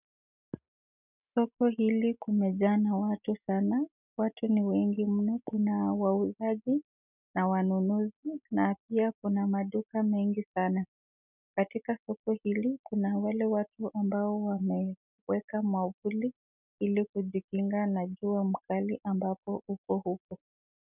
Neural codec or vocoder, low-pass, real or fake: none; 3.6 kHz; real